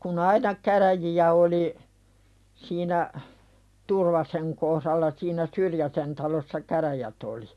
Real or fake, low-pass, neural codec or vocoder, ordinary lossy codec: real; none; none; none